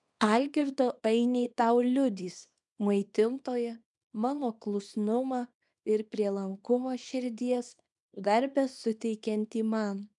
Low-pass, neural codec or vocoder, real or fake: 10.8 kHz; codec, 24 kHz, 0.9 kbps, WavTokenizer, small release; fake